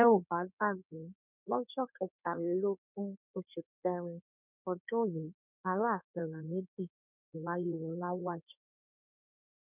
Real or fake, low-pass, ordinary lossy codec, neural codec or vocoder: fake; 3.6 kHz; none; codec, 16 kHz in and 24 kHz out, 2.2 kbps, FireRedTTS-2 codec